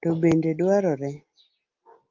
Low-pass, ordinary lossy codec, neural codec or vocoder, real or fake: 7.2 kHz; Opus, 24 kbps; none; real